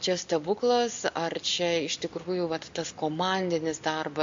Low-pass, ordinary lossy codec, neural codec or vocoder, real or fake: 7.2 kHz; AAC, 64 kbps; none; real